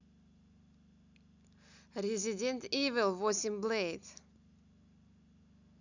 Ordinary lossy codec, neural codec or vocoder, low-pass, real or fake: none; none; 7.2 kHz; real